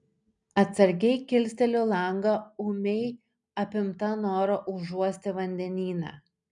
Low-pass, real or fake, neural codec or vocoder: 10.8 kHz; real; none